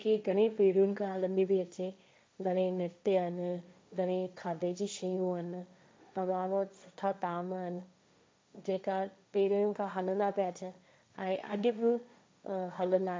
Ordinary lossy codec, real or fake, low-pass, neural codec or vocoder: none; fake; none; codec, 16 kHz, 1.1 kbps, Voila-Tokenizer